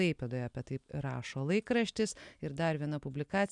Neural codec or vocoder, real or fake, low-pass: none; real; 10.8 kHz